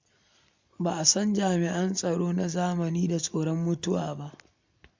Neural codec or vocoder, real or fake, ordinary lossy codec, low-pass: none; real; MP3, 64 kbps; 7.2 kHz